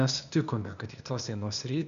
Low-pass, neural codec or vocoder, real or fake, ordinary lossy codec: 7.2 kHz; codec, 16 kHz, 0.8 kbps, ZipCodec; fake; MP3, 96 kbps